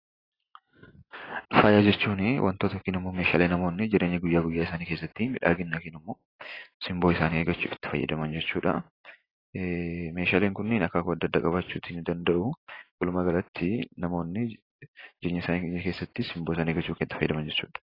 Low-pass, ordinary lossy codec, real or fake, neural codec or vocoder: 5.4 kHz; AAC, 24 kbps; real; none